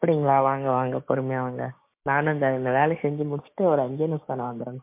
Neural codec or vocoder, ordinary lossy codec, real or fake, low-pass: codec, 16 kHz, 6 kbps, DAC; MP3, 24 kbps; fake; 3.6 kHz